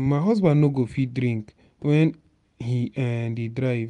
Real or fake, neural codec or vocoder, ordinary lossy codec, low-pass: real; none; none; 10.8 kHz